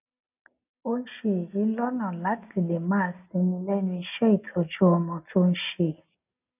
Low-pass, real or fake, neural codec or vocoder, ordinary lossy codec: 3.6 kHz; real; none; none